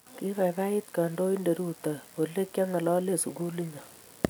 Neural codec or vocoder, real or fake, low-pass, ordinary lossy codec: none; real; none; none